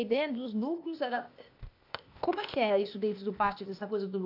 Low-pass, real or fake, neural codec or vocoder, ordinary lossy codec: 5.4 kHz; fake; codec, 16 kHz, 0.8 kbps, ZipCodec; AAC, 48 kbps